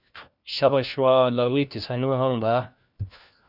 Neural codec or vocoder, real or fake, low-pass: codec, 16 kHz, 1 kbps, FunCodec, trained on LibriTTS, 50 frames a second; fake; 5.4 kHz